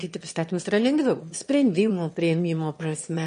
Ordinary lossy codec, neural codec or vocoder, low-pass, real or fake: MP3, 48 kbps; autoencoder, 22.05 kHz, a latent of 192 numbers a frame, VITS, trained on one speaker; 9.9 kHz; fake